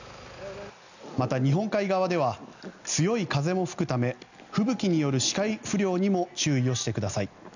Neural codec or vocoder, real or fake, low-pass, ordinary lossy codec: none; real; 7.2 kHz; none